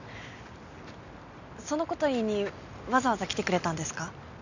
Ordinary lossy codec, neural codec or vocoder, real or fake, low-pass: none; none; real; 7.2 kHz